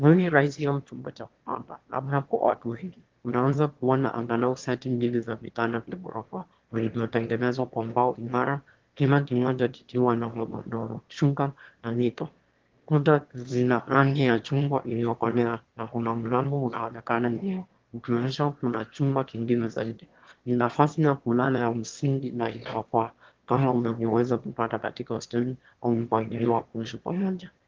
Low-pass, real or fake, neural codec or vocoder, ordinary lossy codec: 7.2 kHz; fake; autoencoder, 22.05 kHz, a latent of 192 numbers a frame, VITS, trained on one speaker; Opus, 16 kbps